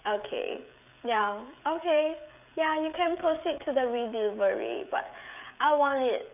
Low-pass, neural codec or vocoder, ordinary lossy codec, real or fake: 3.6 kHz; codec, 16 kHz, 16 kbps, FreqCodec, smaller model; none; fake